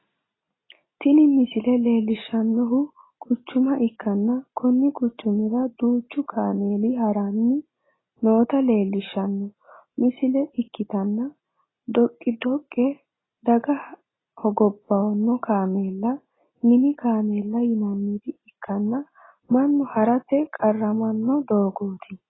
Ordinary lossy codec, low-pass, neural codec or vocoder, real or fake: AAC, 16 kbps; 7.2 kHz; none; real